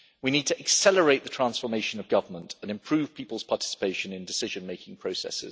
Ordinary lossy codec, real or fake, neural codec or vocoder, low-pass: none; real; none; none